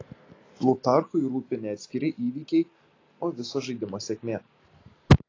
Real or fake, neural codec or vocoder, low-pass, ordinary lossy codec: real; none; 7.2 kHz; AAC, 32 kbps